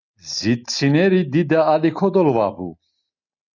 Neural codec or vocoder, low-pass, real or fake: none; 7.2 kHz; real